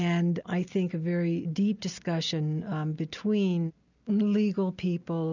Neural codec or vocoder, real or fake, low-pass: none; real; 7.2 kHz